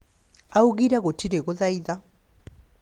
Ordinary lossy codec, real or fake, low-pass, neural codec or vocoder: Opus, 24 kbps; real; 19.8 kHz; none